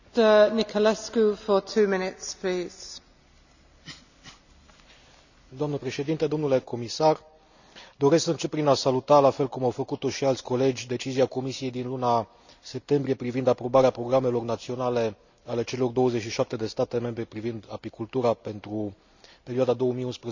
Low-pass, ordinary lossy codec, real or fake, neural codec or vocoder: 7.2 kHz; none; real; none